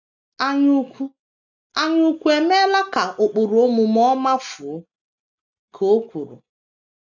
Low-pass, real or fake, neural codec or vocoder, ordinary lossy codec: 7.2 kHz; real; none; none